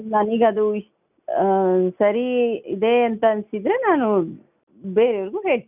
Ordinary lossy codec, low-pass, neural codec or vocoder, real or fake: none; 3.6 kHz; none; real